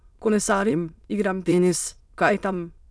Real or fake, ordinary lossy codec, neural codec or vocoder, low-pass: fake; none; autoencoder, 22.05 kHz, a latent of 192 numbers a frame, VITS, trained on many speakers; none